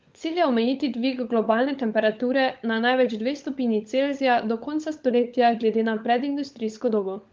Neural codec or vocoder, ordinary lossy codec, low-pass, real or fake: codec, 16 kHz, 16 kbps, FunCodec, trained on LibriTTS, 50 frames a second; Opus, 24 kbps; 7.2 kHz; fake